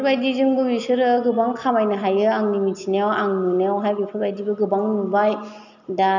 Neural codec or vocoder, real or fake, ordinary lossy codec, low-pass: none; real; none; 7.2 kHz